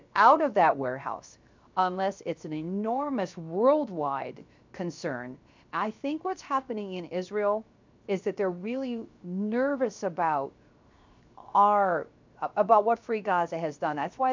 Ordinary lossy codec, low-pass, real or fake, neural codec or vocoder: MP3, 64 kbps; 7.2 kHz; fake; codec, 16 kHz, 0.7 kbps, FocalCodec